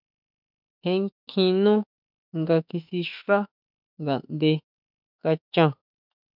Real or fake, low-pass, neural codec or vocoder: fake; 5.4 kHz; autoencoder, 48 kHz, 32 numbers a frame, DAC-VAE, trained on Japanese speech